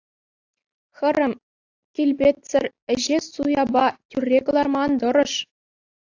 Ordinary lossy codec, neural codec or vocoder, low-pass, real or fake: AAC, 48 kbps; none; 7.2 kHz; real